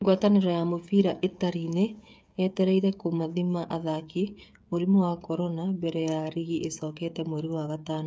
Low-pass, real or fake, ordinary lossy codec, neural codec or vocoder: none; fake; none; codec, 16 kHz, 8 kbps, FreqCodec, smaller model